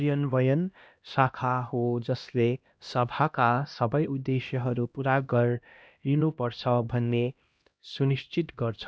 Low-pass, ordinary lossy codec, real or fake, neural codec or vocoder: none; none; fake; codec, 16 kHz, 1 kbps, X-Codec, HuBERT features, trained on LibriSpeech